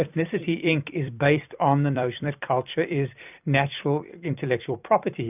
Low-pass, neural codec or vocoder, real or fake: 3.6 kHz; vocoder, 44.1 kHz, 128 mel bands every 256 samples, BigVGAN v2; fake